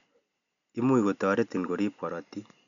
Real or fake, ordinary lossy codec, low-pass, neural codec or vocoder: real; none; 7.2 kHz; none